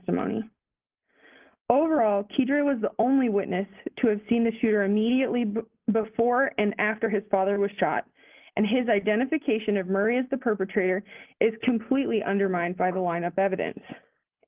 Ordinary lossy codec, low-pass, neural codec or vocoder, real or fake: Opus, 24 kbps; 3.6 kHz; none; real